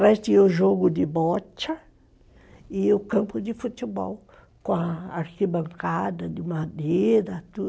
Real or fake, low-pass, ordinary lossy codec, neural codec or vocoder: real; none; none; none